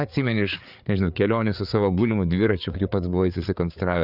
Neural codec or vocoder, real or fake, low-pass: codec, 16 kHz, 4 kbps, FreqCodec, larger model; fake; 5.4 kHz